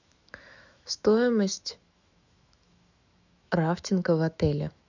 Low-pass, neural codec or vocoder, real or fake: 7.2 kHz; autoencoder, 48 kHz, 128 numbers a frame, DAC-VAE, trained on Japanese speech; fake